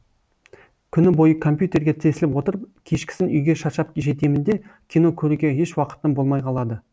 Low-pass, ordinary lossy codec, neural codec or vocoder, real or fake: none; none; none; real